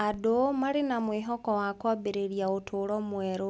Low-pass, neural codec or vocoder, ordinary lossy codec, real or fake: none; none; none; real